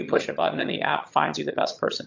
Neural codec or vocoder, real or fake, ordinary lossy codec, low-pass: vocoder, 22.05 kHz, 80 mel bands, HiFi-GAN; fake; MP3, 48 kbps; 7.2 kHz